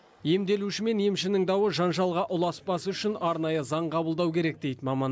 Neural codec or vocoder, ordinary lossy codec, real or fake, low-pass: none; none; real; none